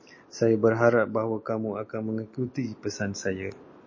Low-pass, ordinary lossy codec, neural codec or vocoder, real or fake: 7.2 kHz; MP3, 32 kbps; none; real